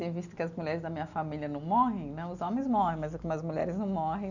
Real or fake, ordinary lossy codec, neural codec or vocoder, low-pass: real; none; none; 7.2 kHz